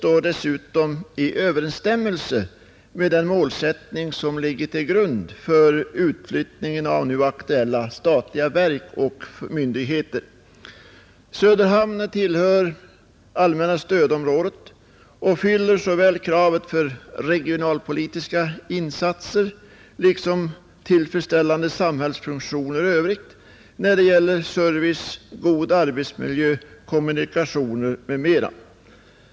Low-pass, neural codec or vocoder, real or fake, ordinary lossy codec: none; none; real; none